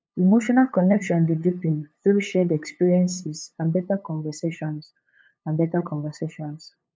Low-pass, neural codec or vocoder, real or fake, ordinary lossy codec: none; codec, 16 kHz, 8 kbps, FunCodec, trained on LibriTTS, 25 frames a second; fake; none